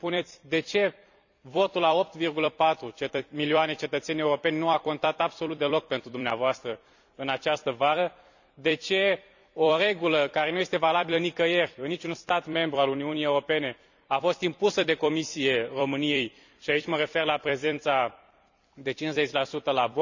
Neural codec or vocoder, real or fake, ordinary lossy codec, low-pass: vocoder, 44.1 kHz, 128 mel bands every 256 samples, BigVGAN v2; fake; none; 7.2 kHz